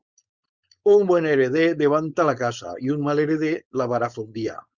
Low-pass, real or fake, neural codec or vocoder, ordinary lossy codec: 7.2 kHz; fake; codec, 16 kHz, 4.8 kbps, FACodec; Opus, 64 kbps